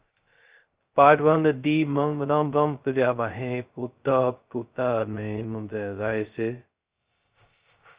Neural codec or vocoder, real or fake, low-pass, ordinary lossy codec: codec, 16 kHz, 0.2 kbps, FocalCodec; fake; 3.6 kHz; Opus, 24 kbps